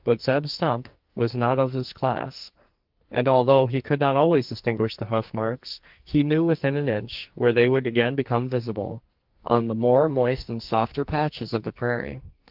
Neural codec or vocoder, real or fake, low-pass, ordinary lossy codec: codec, 44.1 kHz, 2.6 kbps, SNAC; fake; 5.4 kHz; Opus, 32 kbps